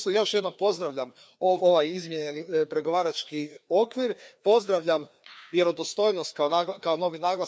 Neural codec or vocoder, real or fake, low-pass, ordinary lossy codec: codec, 16 kHz, 2 kbps, FreqCodec, larger model; fake; none; none